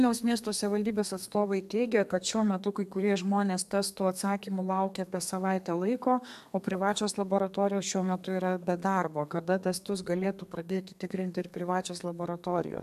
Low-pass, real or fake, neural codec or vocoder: 14.4 kHz; fake; codec, 32 kHz, 1.9 kbps, SNAC